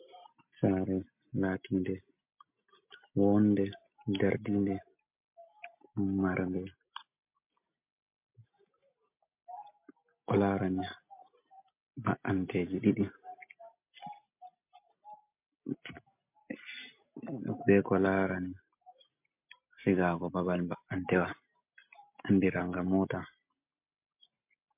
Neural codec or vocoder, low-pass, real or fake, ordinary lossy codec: none; 3.6 kHz; real; MP3, 32 kbps